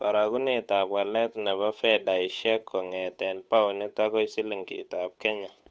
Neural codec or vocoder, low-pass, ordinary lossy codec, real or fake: codec, 16 kHz, 8 kbps, FunCodec, trained on Chinese and English, 25 frames a second; none; none; fake